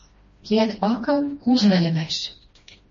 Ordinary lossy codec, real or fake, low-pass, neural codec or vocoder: MP3, 32 kbps; fake; 7.2 kHz; codec, 16 kHz, 1 kbps, FreqCodec, smaller model